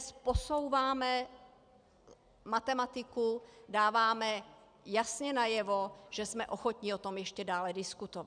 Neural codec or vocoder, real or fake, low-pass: none; real; 9.9 kHz